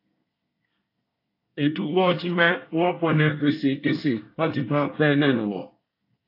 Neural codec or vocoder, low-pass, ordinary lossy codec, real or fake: codec, 24 kHz, 1 kbps, SNAC; 5.4 kHz; AAC, 32 kbps; fake